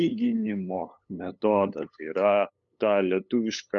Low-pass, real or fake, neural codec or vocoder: 7.2 kHz; fake; codec, 16 kHz, 8 kbps, FunCodec, trained on LibriTTS, 25 frames a second